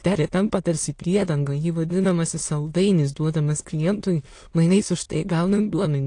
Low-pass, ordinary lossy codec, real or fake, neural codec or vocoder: 9.9 kHz; AAC, 48 kbps; fake; autoencoder, 22.05 kHz, a latent of 192 numbers a frame, VITS, trained on many speakers